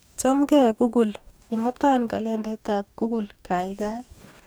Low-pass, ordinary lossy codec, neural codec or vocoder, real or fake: none; none; codec, 44.1 kHz, 2.6 kbps, DAC; fake